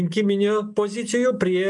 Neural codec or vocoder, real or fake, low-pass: none; real; 10.8 kHz